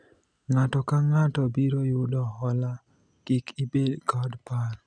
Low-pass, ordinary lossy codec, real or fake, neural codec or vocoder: 9.9 kHz; none; real; none